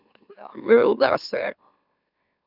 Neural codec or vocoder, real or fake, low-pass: autoencoder, 44.1 kHz, a latent of 192 numbers a frame, MeloTTS; fake; 5.4 kHz